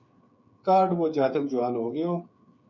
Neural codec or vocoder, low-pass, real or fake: codec, 16 kHz, 8 kbps, FreqCodec, smaller model; 7.2 kHz; fake